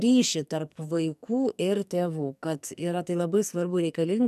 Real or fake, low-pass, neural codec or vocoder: fake; 14.4 kHz; codec, 44.1 kHz, 2.6 kbps, SNAC